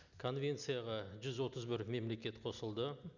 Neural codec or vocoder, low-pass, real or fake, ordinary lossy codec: none; 7.2 kHz; real; none